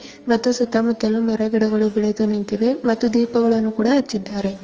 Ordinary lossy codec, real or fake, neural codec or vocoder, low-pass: Opus, 24 kbps; fake; codec, 44.1 kHz, 2.6 kbps, SNAC; 7.2 kHz